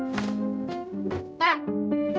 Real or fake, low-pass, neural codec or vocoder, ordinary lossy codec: fake; none; codec, 16 kHz, 0.5 kbps, X-Codec, HuBERT features, trained on general audio; none